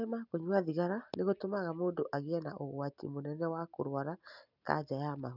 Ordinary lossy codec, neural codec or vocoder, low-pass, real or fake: none; none; 5.4 kHz; real